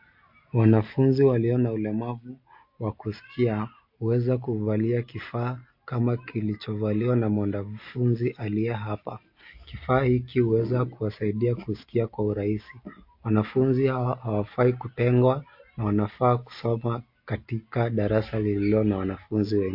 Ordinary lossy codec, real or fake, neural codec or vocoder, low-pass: MP3, 32 kbps; real; none; 5.4 kHz